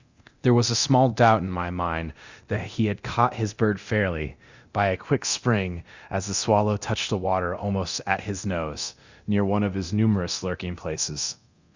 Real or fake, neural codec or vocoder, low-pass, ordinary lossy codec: fake; codec, 24 kHz, 0.9 kbps, DualCodec; 7.2 kHz; Opus, 64 kbps